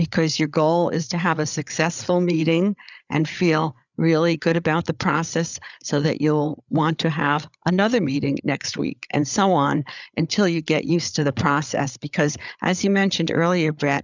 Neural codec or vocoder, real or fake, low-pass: codec, 16 kHz, 8 kbps, FreqCodec, larger model; fake; 7.2 kHz